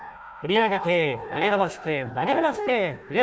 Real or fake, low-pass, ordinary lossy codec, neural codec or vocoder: fake; none; none; codec, 16 kHz, 1 kbps, FunCodec, trained on Chinese and English, 50 frames a second